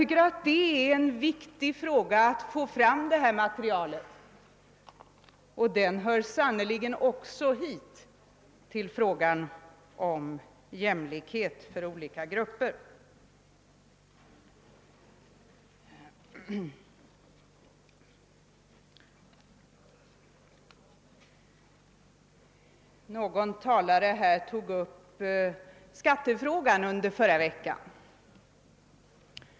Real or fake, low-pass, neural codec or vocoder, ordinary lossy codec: real; none; none; none